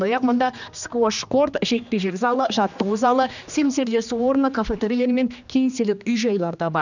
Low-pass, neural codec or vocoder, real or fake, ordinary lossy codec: 7.2 kHz; codec, 16 kHz, 2 kbps, X-Codec, HuBERT features, trained on general audio; fake; none